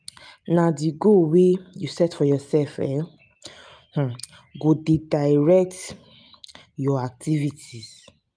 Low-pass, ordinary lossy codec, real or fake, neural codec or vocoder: 9.9 kHz; none; real; none